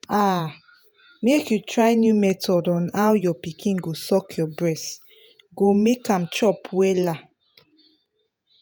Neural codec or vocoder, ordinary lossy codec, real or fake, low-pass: vocoder, 48 kHz, 128 mel bands, Vocos; none; fake; none